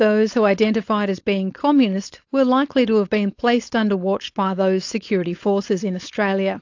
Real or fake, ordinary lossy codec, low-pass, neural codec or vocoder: fake; AAC, 48 kbps; 7.2 kHz; codec, 16 kHz, 4.8 kbps, FACodec